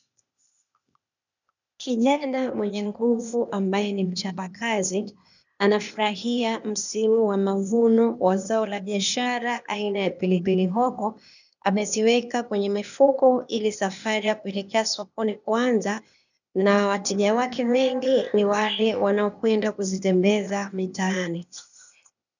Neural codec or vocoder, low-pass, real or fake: codec, 16 kHz, 0.8 kbps, ZipCodec; 7.2 kHz; fake